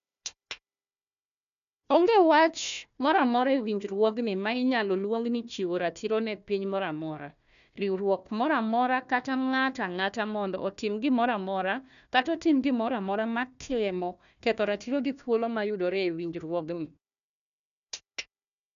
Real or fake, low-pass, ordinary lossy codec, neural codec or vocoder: fake; 7.2 kHz; none; codec, 16 kHz, 1 kbps, FunCodec, trained on Chinese and English, 50 frames a second